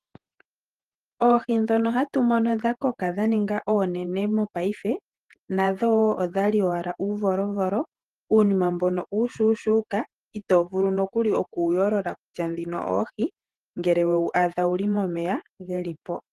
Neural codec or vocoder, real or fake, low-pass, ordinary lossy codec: vocoder, 48 kHz, 128 mel bands, Vocos; fake; 14.4 kHz; Opus, 24 kbps